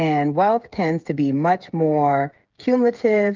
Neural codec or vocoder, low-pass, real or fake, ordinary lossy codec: codec, 16 kHz, 8 kbps, FreqCodec, smaller model; 7.2 kHz; fake; Opus, 32 kbps